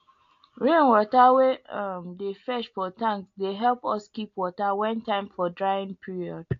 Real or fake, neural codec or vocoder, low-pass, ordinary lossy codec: real; none; 7.2 kHz; AAC, 48 kbps